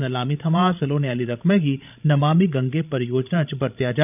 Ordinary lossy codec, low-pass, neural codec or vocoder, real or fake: none; 3.6 kHz; codec, 16 kHz, 16 kbps, FreqCodec, larger model; fake